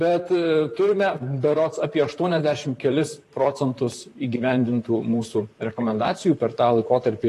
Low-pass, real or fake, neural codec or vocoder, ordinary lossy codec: 14.4 kHz; fake; vocoder, 44.1 kHz, 128 mel bands, Pupu-Vocoder; AAC, 48 kbps